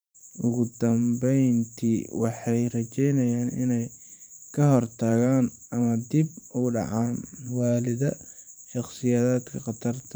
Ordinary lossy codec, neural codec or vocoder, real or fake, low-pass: none; vocoder, 44.1 kHz, 128 mel bands every 512 samples, BigVGAN v2; fake; none